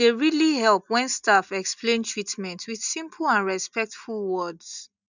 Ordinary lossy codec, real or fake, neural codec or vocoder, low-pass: none; real; none; 7.2 kHz